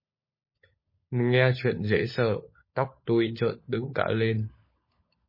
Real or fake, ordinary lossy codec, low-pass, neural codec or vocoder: fake; MP3, 24 kbps; 5.4 kHz; codec, 16 kHz, 16 kbps, FunCodec, trained on LibriTTS, 50 frames a second